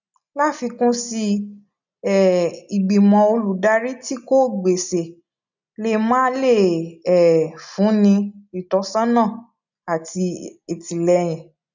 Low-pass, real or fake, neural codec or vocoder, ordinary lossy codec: 7.2 kHz; real; none; none